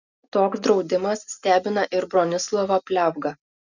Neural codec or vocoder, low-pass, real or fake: none; 7.2 kHz; real